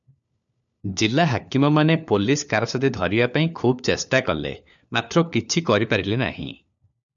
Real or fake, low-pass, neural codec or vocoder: fake; 7.2 kHz; codec, 16 kHz, 4 kbps, FunCodec, trained on LibriTTS, 50 frames a second